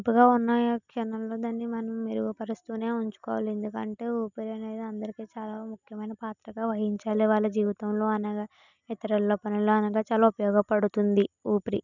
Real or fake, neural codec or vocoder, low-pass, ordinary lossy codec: real; none; 7.2 kHz; none